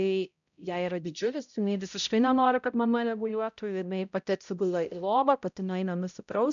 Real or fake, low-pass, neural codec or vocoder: fake; 7.2 kHz; codec, 16 kHz, 0.5 kbps, X-Codec, HuBERT features, trained on balanced general audio